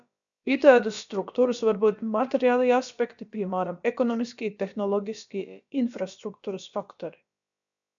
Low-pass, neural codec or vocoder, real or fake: 7.2 kHz; codec, 16 kHz, about 1 kbps, DyCAST, with the encoder's durations; fake